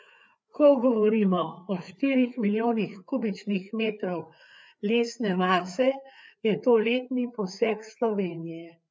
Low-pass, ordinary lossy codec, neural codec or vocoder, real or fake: none; none; codec, 16 kHz, 4 kbps, FreqCodec, larger model; fake